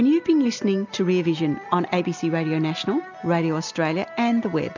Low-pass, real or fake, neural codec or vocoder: 7.2 kHz; real; none